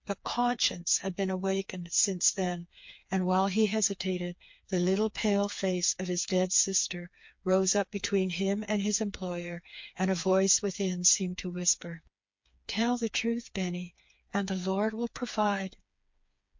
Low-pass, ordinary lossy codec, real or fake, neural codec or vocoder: 7.2 kHz; MP3, 48 kbps; fake; codec, 16 kHz, 4 kbps, FreqCodec, smaller model